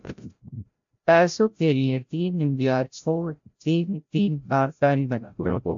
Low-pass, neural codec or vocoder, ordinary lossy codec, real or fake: 7.2 kHz; codec, 16 kHz, 0.5 kbps, FreqCodec, larger model; AAC, 64 kbps; fake